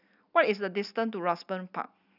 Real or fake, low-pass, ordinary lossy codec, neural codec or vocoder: real; 5.4 kHz; none; none